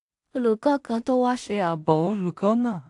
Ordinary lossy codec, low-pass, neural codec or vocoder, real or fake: AAC, 64 kbps; 10.8 kHz; codec, 16 kHz in and 24 kHz out, 0.4 kbps, LongCat-Audio-Codec, two codebook decoder; fake